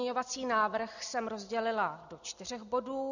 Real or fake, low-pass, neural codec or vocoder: real; 7.2 kHz; none